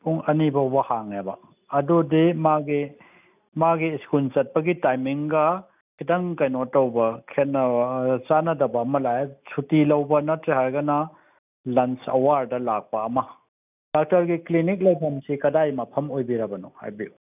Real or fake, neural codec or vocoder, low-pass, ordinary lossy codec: real; none; 3.6 kHz; none